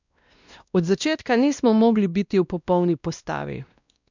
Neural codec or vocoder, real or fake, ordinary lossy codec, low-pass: codec, 16 kHz, 1 kbps, X-Codec, WavLM features, trained on Multilingual LibriSpeech; fake; none; 7.2 kHz